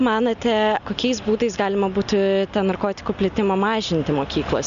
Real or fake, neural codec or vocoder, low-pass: real; none; 7.2 kHz